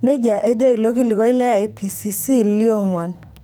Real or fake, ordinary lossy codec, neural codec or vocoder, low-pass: fake; none; codec, 44.1 kHz, 2.6 kbps, SNAC; none